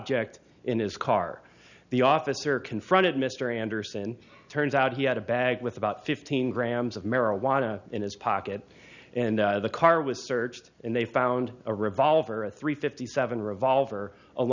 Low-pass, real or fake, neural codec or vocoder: 7.2 kHz; real; none